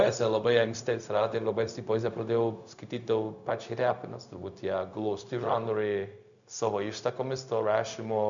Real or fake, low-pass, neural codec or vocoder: fake; 7.2 kHz; codec, 16 kHz, 0.4 kbps, LongCat-Audio-Codec